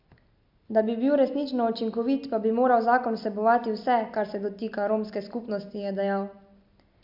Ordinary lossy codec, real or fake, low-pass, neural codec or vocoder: MP3, 48 kbps; real; 5.4 kHz; none